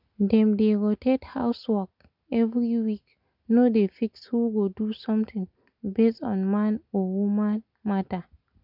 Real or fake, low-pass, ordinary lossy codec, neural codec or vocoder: real; 5.4 kHz; none; none